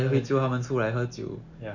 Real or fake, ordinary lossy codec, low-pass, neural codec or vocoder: real; none; 7.2 kHz; none